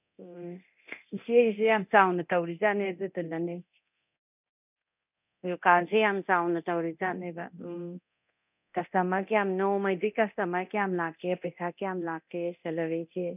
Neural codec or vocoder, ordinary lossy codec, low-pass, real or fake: codec, 24 kHz, 0.9 kbps, DualCodec; none; 3.6 kHz; fake